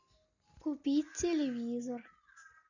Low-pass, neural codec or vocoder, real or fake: 7.2 kHz; none; real